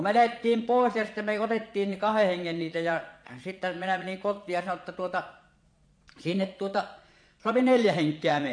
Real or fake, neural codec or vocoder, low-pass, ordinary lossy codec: fake; vocoder, 22.05 kHz, 80 mel bands, Vocos; 9.9 kHz; MP3, 48 kbps